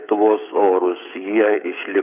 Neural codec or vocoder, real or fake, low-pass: codec, 16 kHz, 8 kbps, FreqCodec, smaller model; fake; 3.6 kHz